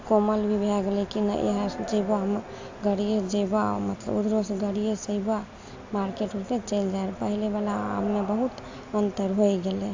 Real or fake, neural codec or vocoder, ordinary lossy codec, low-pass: real; none; AAC, 48 kbps; 7.2 kHz